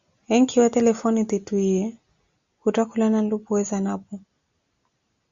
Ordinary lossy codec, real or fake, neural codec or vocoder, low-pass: Opus, 64 kbps; real; none; 7.2 kHz